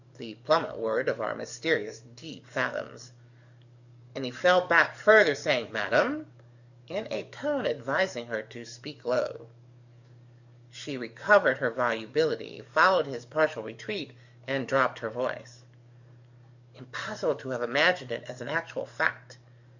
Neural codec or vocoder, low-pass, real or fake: codec, 44.1 kHz, 7.8 kbps, DAC; 7.2 kHz; fake